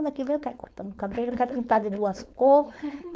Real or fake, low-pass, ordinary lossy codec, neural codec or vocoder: fake; none; none; codec, 16 kHz, 4.8 kbps, FACodec